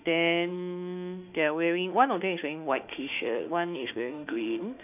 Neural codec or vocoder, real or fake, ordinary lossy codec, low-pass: autoencoder, 48 kHz, 32 numbers a frame, DAC-VAE, trained on Japanese speech; fake; none; 3.6 kHz